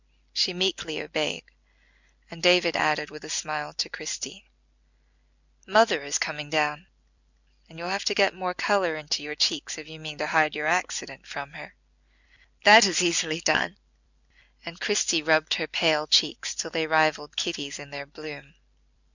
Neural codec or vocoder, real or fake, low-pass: none; real; 7.2 kHz